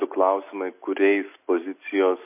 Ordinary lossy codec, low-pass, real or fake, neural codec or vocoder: MP3, 24 kbps; 3.6 kHz; real; none